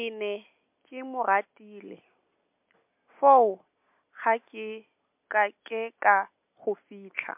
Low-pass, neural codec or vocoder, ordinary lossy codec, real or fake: 3.6 kHz; none; MP3, 32 kbps; real